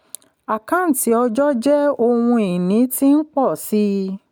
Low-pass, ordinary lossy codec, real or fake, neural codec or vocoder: none; none; real; none